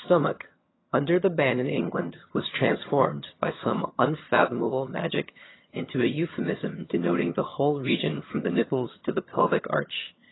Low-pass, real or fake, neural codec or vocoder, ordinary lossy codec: 7.2 kHz; fake; vocoder, 22.05 kHz, 80 mel bands, HiFi-GAN; AAC, 16 kbps